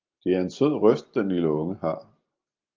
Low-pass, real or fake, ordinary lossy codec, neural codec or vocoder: 7.2 kHz; real; Opus, 24 kbps; none